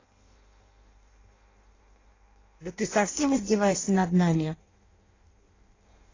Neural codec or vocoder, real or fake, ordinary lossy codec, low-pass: codec, 16 kHz in and 24 kHz out, 0.6 kbps, FireRedTTS-2 codec; fake; AAC, 32 kbps; 7.2 kHz